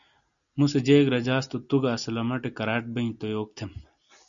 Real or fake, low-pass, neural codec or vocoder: real; 7.2 kHz; none